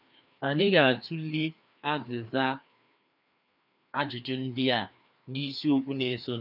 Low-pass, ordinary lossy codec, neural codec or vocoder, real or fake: 5.4 kHz; none; codec, 16 kHz, 2 kbps, FreqCodec, larger model; fake